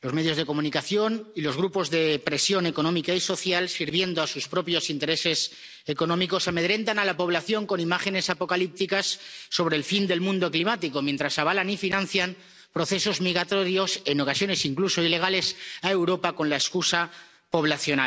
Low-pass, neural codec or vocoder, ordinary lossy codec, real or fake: none; none; none; real